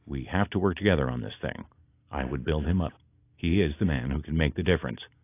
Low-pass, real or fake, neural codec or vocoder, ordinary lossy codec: 3.6 kHz; real; none; AAC, 24 kbps